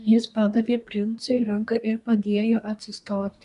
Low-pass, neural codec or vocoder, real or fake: 10.8 kHz; codec, 24 kHz, 1 kbps, SNAC; fake